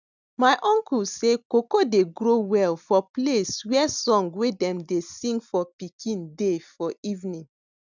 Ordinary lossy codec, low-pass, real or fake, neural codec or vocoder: none; 7.2 kHz; real; none